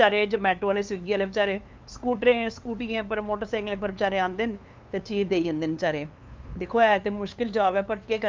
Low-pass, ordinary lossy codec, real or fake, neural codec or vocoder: 7.2 kHz; Opus, 24 kbps; fake; codec, 16 kHz, 2 kbps, FunCodec, trained on LibriTTS, 25 frames a second